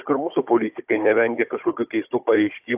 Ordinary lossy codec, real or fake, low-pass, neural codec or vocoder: AAC, 32 kbps; fake; 3.6 kHz; codec, 16 kHz, 16 kbps, FunCodec, trained on Chinese and English, 50 frames a second